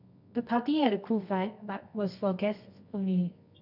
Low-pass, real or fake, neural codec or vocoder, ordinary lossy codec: 5.4 kHz; fake; codec, 24 kHz, 0.9 kbps, WavTokenizer, medium music audio release; none